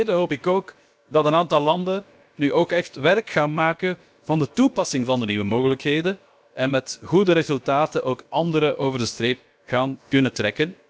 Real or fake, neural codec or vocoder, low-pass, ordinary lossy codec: fake; codec, 16 kHz, about 1 kbps, DyCAST, with the encoder's durations; none; none